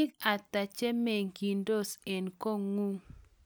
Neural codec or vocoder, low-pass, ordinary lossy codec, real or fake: none; none; none; real